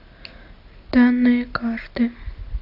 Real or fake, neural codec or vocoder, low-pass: real; none; 5.4 kHz